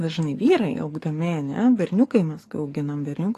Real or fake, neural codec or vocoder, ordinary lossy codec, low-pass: fake; codec, 44.1 kHz, 7.8 kbps, DAC; AAC, 48 kbps; 14.4 kHz